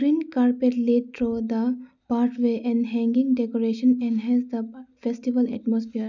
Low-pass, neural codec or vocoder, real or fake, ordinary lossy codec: 7.2 kHz; none; real; none